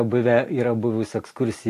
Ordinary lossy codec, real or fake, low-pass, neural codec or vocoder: AAC, 64 kbps; real; 14.4 kHz; none